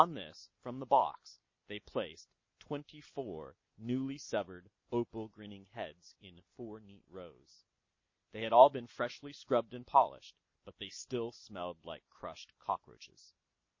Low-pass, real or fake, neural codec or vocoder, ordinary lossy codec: 7.2 kHz; real; none; MP3, 32 kbps